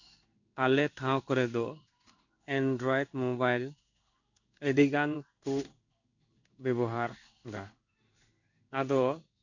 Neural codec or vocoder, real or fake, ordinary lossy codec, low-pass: codec, 16 kHz in and 24 kHz out, 1 kbps, XY-Tokenizer; fake; none; 7.2 kHz